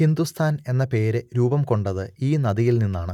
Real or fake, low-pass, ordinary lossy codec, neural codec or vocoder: real; 19.8 kHz; none; none